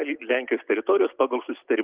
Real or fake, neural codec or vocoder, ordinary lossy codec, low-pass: real; none; Opus, 32 kbps; 3.6 kHz